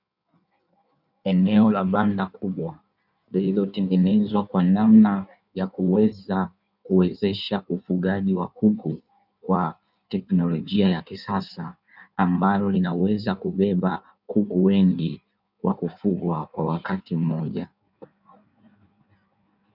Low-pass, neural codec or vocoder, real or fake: 5.4 kHz; codec, 16 kHz in and 24 kHz out, 1.1 kbps, FireRedTTS-2 codec; fake